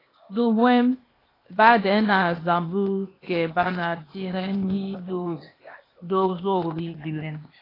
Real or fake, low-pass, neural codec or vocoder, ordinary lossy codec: fake; 5.4 kHz; codec, 16 kHz, 0.8 kbps, ZipCodec; AAC, 24 kbps